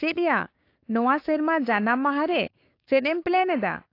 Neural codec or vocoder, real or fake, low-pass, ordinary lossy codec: codec, 16 kHz, 6 kbps, DAC; fake; 5.4 kHz; AAC, 32 kbps